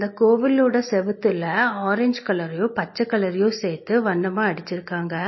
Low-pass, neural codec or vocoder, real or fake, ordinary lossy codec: 7.2 kHz; none; real; MP3, 24 kbps